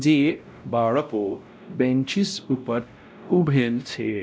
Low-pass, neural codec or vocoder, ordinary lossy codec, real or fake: none; codec, 16 kHz, 0.5 kbps, X-Codec, WavLM features, trained on Multilingual LibriSpeech; none; fake